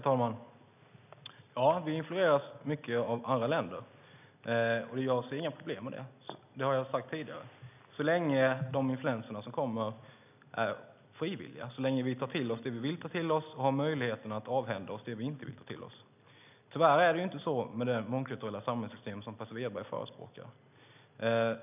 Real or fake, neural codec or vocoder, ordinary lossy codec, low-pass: real; none; none; 3.6 kHz